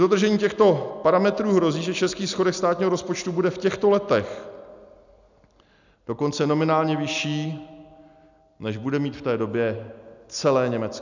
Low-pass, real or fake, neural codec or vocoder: 7.2 kHz; real; none